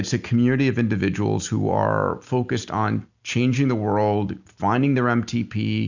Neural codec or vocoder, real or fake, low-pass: none; real; 7.2 kHz